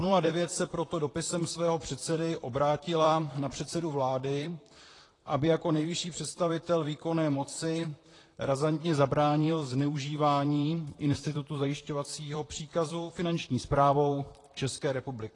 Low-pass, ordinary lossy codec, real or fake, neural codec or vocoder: 10.8 kHz; AAC, 32 kbps; fake; vocoder, 44.1 kHz, 128 mel bands, Pupu-Vocoder